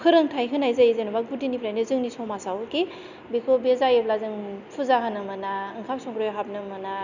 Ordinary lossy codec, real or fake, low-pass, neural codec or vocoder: none; real; 7.2 kHz; none